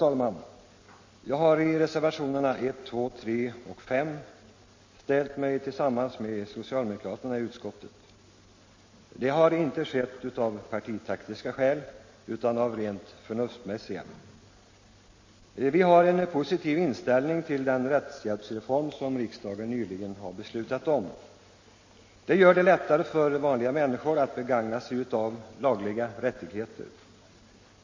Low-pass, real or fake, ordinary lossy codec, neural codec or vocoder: 7.2 kHz; real; MP3, 32 kbps; none